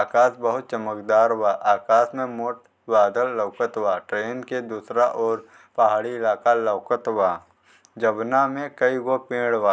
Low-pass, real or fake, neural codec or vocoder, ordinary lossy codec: none; real; none; none